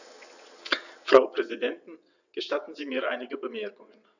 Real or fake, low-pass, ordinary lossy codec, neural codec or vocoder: fake; 7.2 kHz; none; vocoder, 22.05 kHz, 80 mel bands, WaveNeXt